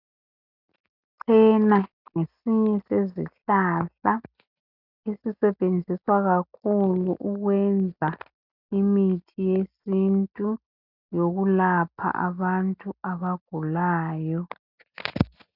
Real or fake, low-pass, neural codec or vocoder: real; 5.4 kHz; none